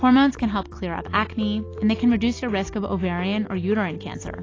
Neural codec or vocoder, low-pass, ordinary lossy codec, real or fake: none; 7.2 kHz; AAC, 32 kbps; real